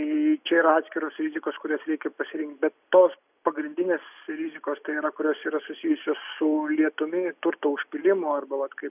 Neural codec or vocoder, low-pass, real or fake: none; 3.6 kHz; real